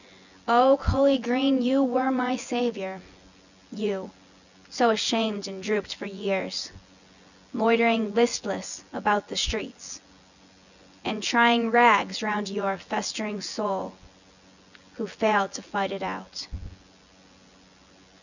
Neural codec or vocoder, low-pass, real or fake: vocoder, 24 kHz, 100 mel bands, Vocos; 7.2 kHz; fake